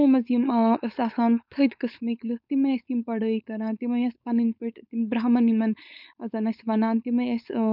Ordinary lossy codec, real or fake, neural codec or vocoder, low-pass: none; fake; codec, 16 kHz, 4.8 kbps, FACodec; 5.4 kHz